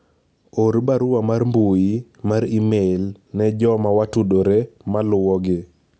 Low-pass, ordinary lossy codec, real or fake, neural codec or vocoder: none; none; real; none